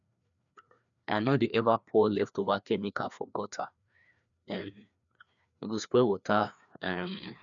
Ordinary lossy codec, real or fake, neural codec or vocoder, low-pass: none; fake; codec, 16 kHz, 2 kbps, FreqCodec, larger model; 7.2 kHz